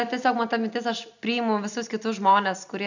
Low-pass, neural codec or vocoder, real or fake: 7.2 kHz; none; real